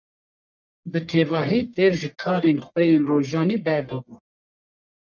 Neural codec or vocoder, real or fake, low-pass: codec, 44.1 kHz, 1.7 kbps, Pupu-Codec; fake; 7.2 kHz